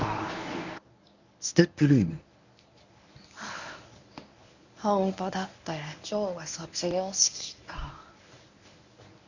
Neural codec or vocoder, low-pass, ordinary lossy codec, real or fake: codec, 24 kHz, 0.9 kbps, WavTokenizer, medium speech release version 1; 7.2 kHz; none; fake